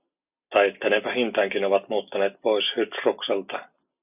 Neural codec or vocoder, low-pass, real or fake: none; 3.6 kHz; real